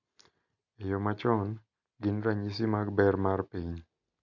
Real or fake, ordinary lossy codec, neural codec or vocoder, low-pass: real; none; none; 7.2 kHz